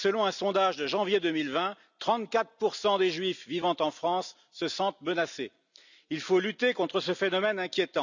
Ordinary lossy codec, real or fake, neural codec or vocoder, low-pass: none; real; none; 7.2 kHz